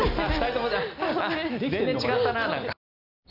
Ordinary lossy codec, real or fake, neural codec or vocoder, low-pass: none; real; none; 5.4 kHz